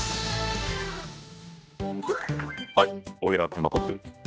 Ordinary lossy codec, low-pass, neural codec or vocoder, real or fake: none; none; codec, 16 kHz, 1 kbps, X-Codec, HuBERT features, trained on general audio; fake